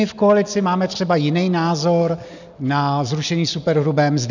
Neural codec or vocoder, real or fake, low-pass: none; real; 7.2 kHz